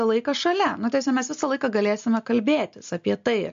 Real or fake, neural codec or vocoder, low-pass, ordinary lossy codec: real; none; 7.2 kHz; MP3, 48 kbps